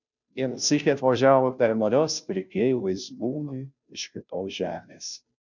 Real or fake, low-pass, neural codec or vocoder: fake; 7.2 kHz; codec, 16 kHz, 0.5 kbps, FunCodec, trained on Chinese and English, 25 frames a second